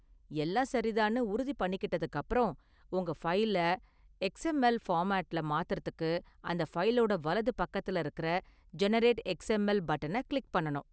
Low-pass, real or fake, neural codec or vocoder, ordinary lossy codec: none; real; none; none